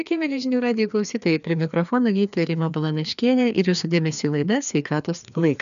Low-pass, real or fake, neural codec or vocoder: 7.2 kHz; fake; codec, 16 kHz, 2 kbps, FreqCodec, larger model